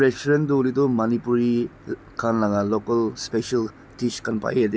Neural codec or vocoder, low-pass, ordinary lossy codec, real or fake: none; none; none; real